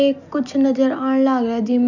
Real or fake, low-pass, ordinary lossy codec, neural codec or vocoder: real; 7.2 kHz; none; none